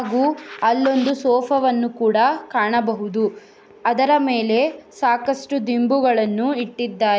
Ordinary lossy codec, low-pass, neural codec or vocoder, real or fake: none; none; none; real